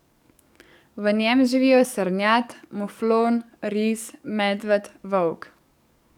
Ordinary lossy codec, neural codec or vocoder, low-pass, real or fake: none; codec, 44.1 kHz, 7.8 kbps, DAC; 19.8 kHz; fake